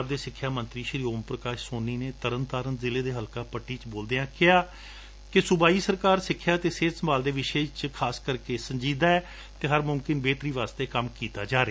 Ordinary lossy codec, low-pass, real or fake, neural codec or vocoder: none; none; real; none